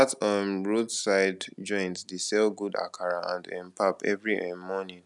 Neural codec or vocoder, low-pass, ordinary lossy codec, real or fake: none; 10.8 kHz; none; real